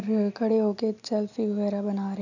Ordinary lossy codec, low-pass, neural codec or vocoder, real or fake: none; 7.2 kHz; none; real